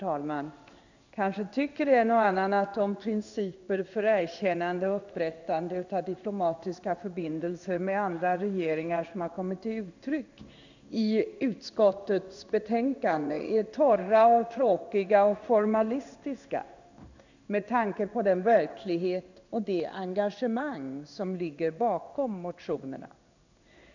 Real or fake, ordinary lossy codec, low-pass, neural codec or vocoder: fake; none; 7.2 kHz; codec, 16 kHz in and 24 kHz out, 1 kbps, XY-Tokenizer